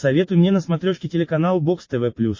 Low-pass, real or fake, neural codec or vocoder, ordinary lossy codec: 7.2 kHz; real; none; MP3, 32 kbps